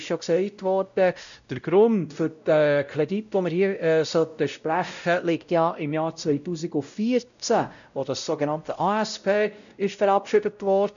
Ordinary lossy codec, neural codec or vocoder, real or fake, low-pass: none; codec, 16 kHz, 0.5 kbps, X-Codec, WavLM features, trained on Multilingual LibriSpeech; fake; 7.2 kHz